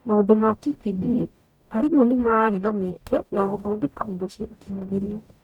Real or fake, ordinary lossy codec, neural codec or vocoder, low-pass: fake; none; codec, 44.1 kHz, 0.9 kbps, DAC; 19.8 kHz